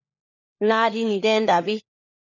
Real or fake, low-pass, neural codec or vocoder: fake; 7.2 kHz; codec, 16 kHz, 4 kbps, FunCodec, trained on LibriTTS, 50 frames a second